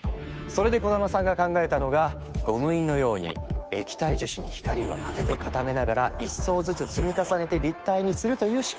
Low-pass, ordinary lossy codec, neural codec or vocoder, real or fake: none; none; codec, 16 kHz, 2 kbps, FunCodec, trained on Chinese and English, 25 frames a second; fake